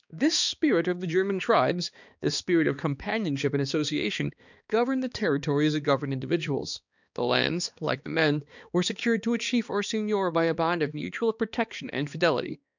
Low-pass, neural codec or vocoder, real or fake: 7.2 kHz; codec, 16 kHz, 2 kbps, X-Codec, HuBERT features, trained on balanced general audio; fake